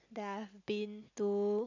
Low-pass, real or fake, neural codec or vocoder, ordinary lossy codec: 7.2 kHz; real; none; none